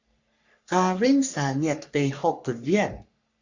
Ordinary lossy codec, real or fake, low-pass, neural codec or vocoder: Opus, 64 kbps; fake; 7.2 kHz; codec, 44.1 kHz, 3.4 kbps, Pupu-Codec